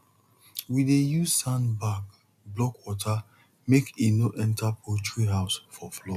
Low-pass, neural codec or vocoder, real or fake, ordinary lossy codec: 14.4 kHz; none; real; none